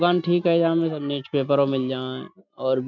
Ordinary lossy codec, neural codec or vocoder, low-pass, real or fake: none; none; 7.2 kHz; real